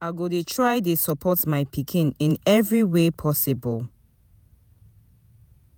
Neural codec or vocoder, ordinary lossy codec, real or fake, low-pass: vocoder, 48 kHz, 128 mel bands, Vocos; none; fake; none